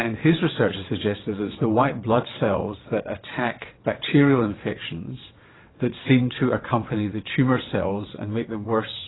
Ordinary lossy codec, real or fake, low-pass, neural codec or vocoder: AAC, 16 kbps; fake; 7.2 kHz; vocoder, 22.05 kHz, 80 mel bands, WaveNeXt